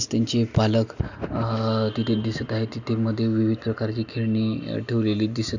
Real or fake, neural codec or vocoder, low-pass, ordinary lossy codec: real; none; 7.2 kHz; none